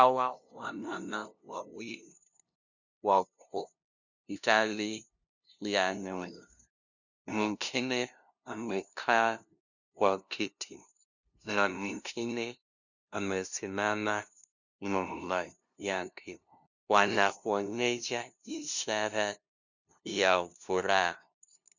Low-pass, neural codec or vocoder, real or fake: 7.2 kHz; codec, 16 kHz, 0.5 kbps, FunCodec, trained on LibriTTS, 25 frames a second; fake